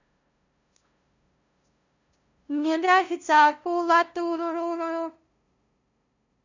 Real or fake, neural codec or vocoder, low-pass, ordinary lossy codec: fake; codec, 16 kHz, 0.5 kbps, FunCodec, trained on LibriTTS, 25 frames a second; 7.2 kHz; none